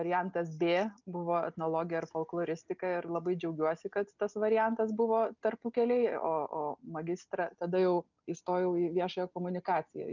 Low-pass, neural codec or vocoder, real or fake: 7.2 kHz; none; real